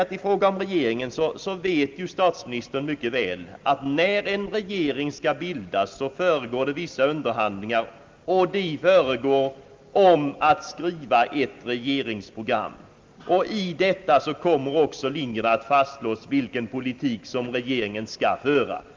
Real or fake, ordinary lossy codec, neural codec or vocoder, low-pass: real; Opus, 32 kbps; none; 7.2 kHz